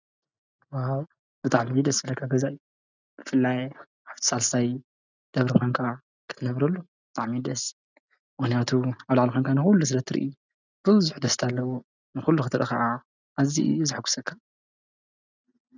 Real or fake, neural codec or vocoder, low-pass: real; none; 7.2 kHz